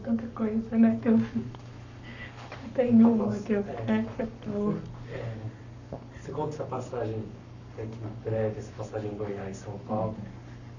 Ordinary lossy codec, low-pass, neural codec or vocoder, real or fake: none; 7.2 kHz; codec, 44.1 kHz, 7.8 kbps, Pupu-Codec; fake